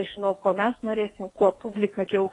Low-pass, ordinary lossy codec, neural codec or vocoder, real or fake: 10.8 kHz; AAC, 32 kbps; codec, 44.1 kHz, 2.6 kbps, SNAC; fake